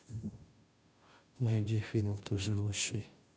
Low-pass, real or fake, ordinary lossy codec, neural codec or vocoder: none; fake; none; codec, 16 kHz, 0.5 kbps, FunCodec, trained on Chinese and English, 25 frames a second